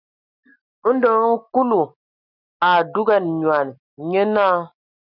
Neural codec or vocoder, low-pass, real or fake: none; 5.4 kHz; real